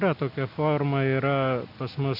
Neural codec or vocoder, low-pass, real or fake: none; 5.4 kHz; real